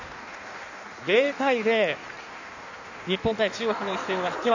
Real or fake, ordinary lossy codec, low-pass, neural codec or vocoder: fake; none; 7.2 kHz; codec, 16 kHz in and 24 kHz out, 1.1 kbps, FireRedTTS-2 codec